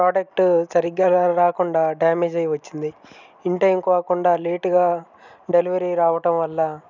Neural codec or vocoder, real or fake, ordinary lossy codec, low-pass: none; real; none; 7.2 kHz